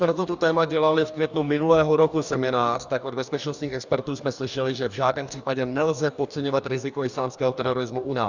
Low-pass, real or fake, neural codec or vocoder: 7.2 kHz; fake; codec, 44.1 kHz, 2.6 kbps, DAC